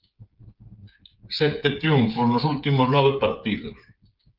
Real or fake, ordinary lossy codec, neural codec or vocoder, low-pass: fake; Opus, 32 kbps; codec, 16 kHz, 8 kbps, FreqCodec, smaller model; 5.4 kHz